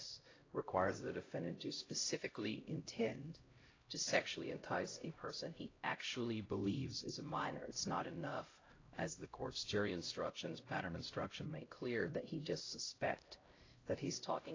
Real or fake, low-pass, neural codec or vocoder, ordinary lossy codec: fake; 7.2 kHz; codec, 16 kHz, 0.5 kbps, X-Codec, HuBERT features, trained on LibriSpeech; AAC, 32 kbps